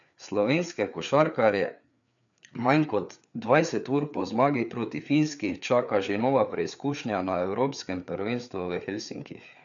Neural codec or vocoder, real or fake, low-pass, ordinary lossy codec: codec, 16 kHz, 4 kbps, FreqCodec, larger model; fake; 7.2 kHz; none